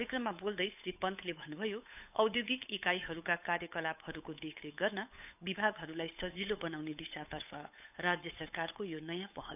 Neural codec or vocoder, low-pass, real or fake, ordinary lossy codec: codec, 16 kHz, 8 kbps, FunCodec, trained on Chinese and English, 25 frames a second; 3.6 kHz; fake; none